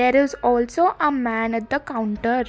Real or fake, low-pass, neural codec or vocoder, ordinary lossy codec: real; none; none; none